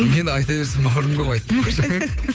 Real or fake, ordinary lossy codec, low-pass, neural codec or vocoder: fake; none; none; codec, 16 kHz, 8 kbps, FunCodec, trained on Chinese and English, 25 frames a second